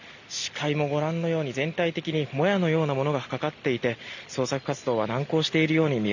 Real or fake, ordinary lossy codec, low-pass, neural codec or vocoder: real; none; 7.2 kHz; none